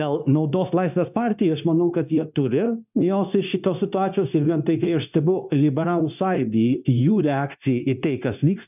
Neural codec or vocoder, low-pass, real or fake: codec, 16 kHz, 0.9 kbps, LongCat-Audio-Codec; 3.6 kHz; fake